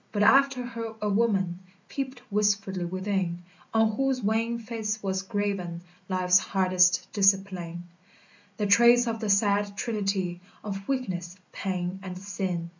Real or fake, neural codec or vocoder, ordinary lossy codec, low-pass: real; none; MP3, 64 kbps; 7.2 kHz